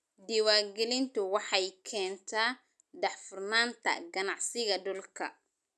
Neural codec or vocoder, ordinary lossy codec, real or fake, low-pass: none; none; real; none